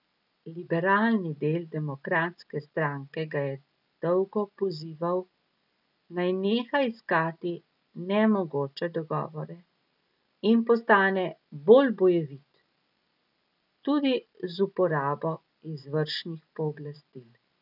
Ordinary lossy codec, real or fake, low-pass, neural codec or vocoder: none; real; 5.4 kHz; none